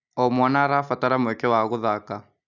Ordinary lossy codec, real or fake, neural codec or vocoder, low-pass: none; real; none; 7.2 kHz